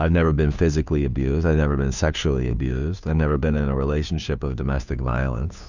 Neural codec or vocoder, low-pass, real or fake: codec, 16 kHz, 2 kbps, FunCodec, trained on Chinese and English, 25 frames a second; 7.2 kHz; fake